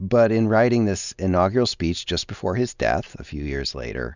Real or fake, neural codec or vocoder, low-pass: real; none; 7.2 kHz